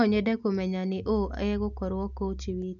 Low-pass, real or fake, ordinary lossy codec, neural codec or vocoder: 7.2 kHz; real; none; none